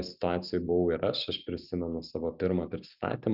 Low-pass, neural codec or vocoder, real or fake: 5.4 kHz; none; real